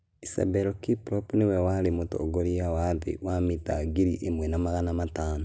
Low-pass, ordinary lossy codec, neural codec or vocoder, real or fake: none; none; none; real